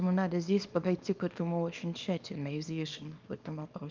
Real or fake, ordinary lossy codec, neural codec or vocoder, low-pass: fake; Opus, 24 kbps; codec, 24 kHz, 0.9 kbps, WavTokenizer, small release; 7.2 kHz